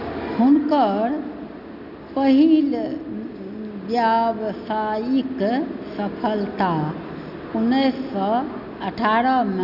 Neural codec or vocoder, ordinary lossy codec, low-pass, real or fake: none; Opus, 64 kbps; 5.4 kHz; real